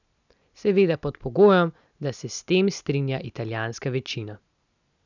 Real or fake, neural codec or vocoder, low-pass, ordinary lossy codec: real; none; 7.2 kHz; none